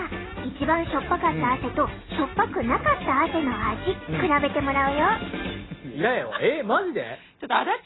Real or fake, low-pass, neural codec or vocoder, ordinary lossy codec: real; 7.2 kHz; none; AAC, 16 kbps